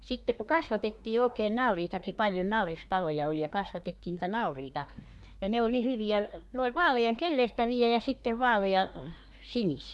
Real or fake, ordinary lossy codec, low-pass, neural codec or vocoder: fake; none; none; codec, 24 kHz, 1 kbps, SNAC